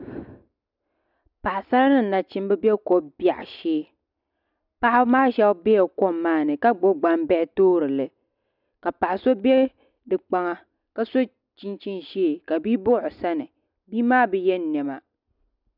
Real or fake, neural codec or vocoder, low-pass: real; none; 5.4 kHz